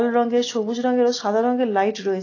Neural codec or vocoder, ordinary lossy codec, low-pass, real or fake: none; AAC, 32 kbps; 7.2 kHz; real